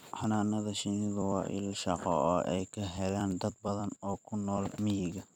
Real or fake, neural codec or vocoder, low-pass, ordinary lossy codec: fake; vocoder, 44.1 kHz, 128 mel bands every 256 samples, BigVGAN v2; 19.8 kHz; none